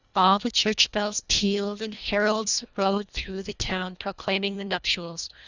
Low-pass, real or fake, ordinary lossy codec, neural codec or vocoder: 7.2 kHz; fake; Opus, 64 kbps; codec, 24 kHz, 1.5 kbps, HILCodec